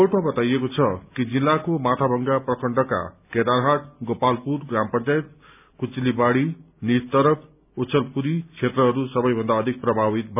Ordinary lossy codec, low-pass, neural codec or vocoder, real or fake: none; 3.6 kHz; none; real